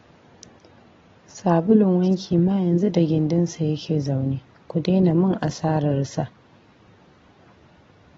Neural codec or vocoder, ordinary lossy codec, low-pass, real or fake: none; AAC, 24 kbps; 7.2 kHz; real